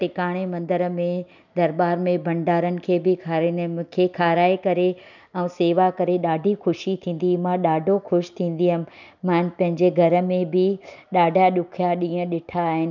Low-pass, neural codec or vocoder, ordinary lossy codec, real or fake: 7.2 kHz; none; none; real